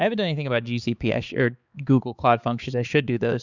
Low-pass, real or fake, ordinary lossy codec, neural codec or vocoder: 7.2 kHz; fake; Opus, 64 kbps; codec, 16 kHz, 4 kbps, X-Codec, HuBERT features, trained on balanced general audio